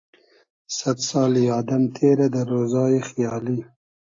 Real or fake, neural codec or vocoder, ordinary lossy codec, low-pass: real; none; AAC, 48 kbps; 7.2 kHz